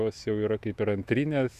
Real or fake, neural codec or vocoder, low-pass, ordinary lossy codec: fake; autoencoder, 48 kHz, 128 numbers a frame, DAC-VAE, trained on Japanese speech; 14.4 kHz; Opus, 64 kbps